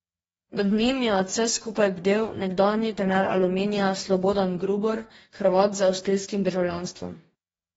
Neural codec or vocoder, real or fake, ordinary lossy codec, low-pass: codec, 44.1 kHz, 2.6 kbps, DAC; fake; AAC, 24 kbps; 19.8 kHz